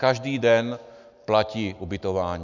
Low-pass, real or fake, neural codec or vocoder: 7.2 kHz; real; none